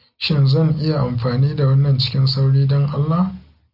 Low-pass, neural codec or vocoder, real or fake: 5.4 kHz; none; real